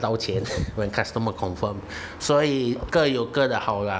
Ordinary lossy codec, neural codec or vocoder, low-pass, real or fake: none; none; none; real